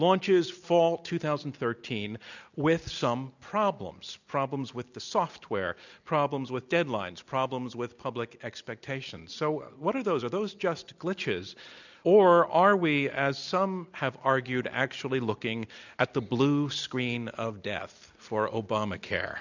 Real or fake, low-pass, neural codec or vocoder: real; 7.2 kHz; none